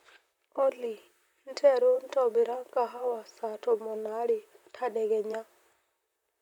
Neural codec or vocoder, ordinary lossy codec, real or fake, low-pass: vocoder, 44.1 kHz, 128 mel bands, Pupu-Vocoder; none; fake; 19.8 kHz